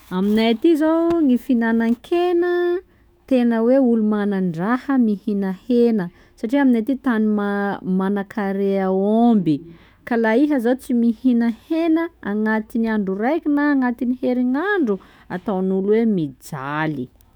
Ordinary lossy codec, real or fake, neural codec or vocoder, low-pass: none; fake; autoencoder, 48 kHz, 128 numbers a frame, DAC-VAE, trained on Japanese speech; none